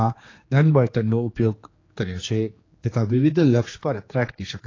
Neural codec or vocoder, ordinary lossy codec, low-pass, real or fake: codec, 16 kHz, 2 kbps, X-Codec, HuBERT features, trained on general audio; AAC, 32 kbps; 7.2 kHz; fake